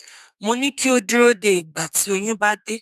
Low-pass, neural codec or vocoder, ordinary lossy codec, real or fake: 14.4 kHz; codec, 32 kHz, 1.9 kbps, SNAC; none; fake